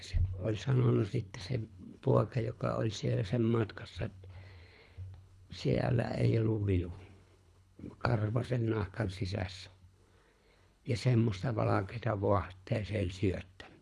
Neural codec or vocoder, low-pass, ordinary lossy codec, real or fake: codec, 24 kHz, 3 kbps, HILCodec; none; none; fake